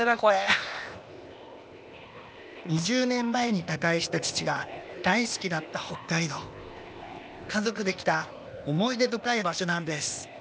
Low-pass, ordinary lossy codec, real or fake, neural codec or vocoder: none; none; fake; codec, 16 kHz, 0.8 kbps, ZipCodec